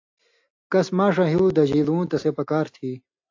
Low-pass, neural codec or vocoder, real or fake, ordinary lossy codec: 7.2 kHz; none; real; AAC, 48 kbps